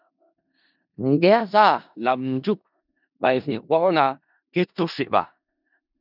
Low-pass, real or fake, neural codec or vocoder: 5.4 kHz; fake; codec, 16 kHz in and 24 kHz out, 0.4 kbps, LongCat-Audio-Codec, four codebook decoder